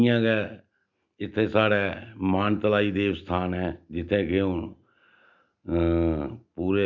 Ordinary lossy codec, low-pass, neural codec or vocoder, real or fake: none; 7.2 kHz; none; real